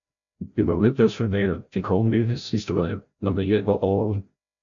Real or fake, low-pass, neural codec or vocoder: fake; 7.2 kHz; codec, 16 kHz, 0.5 kbps, FreqCodec, larger model